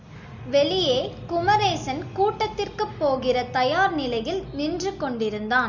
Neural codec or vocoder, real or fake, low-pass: none; real; 7.2 kHz